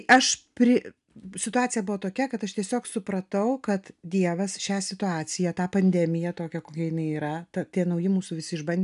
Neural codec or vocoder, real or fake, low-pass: none; real; 10.8 kHz